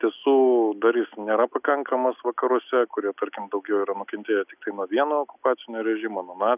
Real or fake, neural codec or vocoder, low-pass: real; none; 3.6 kHz